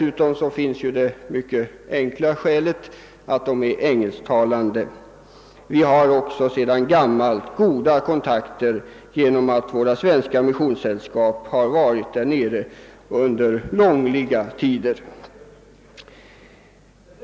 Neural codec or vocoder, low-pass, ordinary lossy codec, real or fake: none; none; none; real